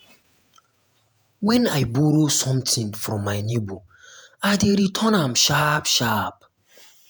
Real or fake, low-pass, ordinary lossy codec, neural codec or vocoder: fake; none; none; vocoder, 48 kHz, 128 mel bands, Vocos